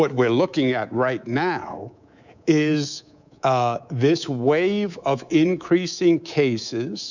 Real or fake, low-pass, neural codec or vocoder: fake; 7.2 kHz; codec, 24 kHz, 3.1 kbps, DualCodec